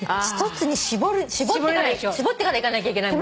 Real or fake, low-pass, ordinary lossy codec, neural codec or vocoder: real; none; none; none